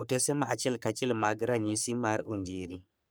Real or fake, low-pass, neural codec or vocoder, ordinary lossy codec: fake; none; codec, 44.1 kHz, 3.4 kbps, Pupu-Codec; none